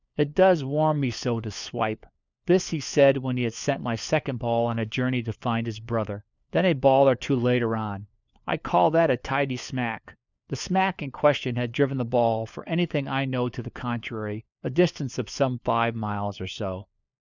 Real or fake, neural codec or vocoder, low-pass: fake; codec, 16 kHz, 4 kbps, FunCodec, trained on LibriTTS, 50 frames a second; 7.2 kHz